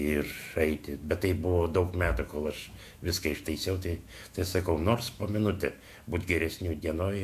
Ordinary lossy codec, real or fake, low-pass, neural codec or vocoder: MP3, 64 kbps; fake; 14.4 kHz; autoencoder, 48 kHz, 128 numbers a frame, DAC-VAE, trained on Japanese speech